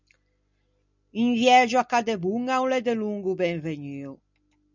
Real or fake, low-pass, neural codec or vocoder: real; 7.2 kHz; none